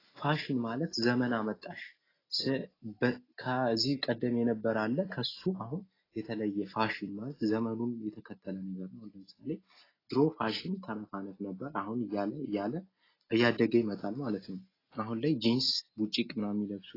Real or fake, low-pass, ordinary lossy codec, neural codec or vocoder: real; 5.4 kHz; AAC, 24 kbps; none